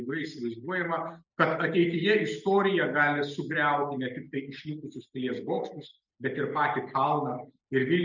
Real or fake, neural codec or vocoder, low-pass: real; none; 7.2 kHz